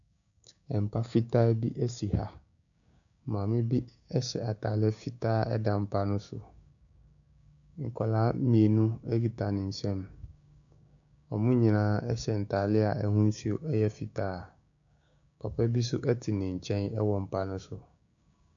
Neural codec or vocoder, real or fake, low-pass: codec, 16 kHz, 6 kbps, DAC; fake; 7.2 kHz